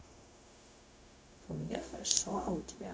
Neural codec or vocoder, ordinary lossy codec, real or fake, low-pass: codec, 16 kHz, 0.4 kbps, LongCat-Audio-Codec; none; fake; none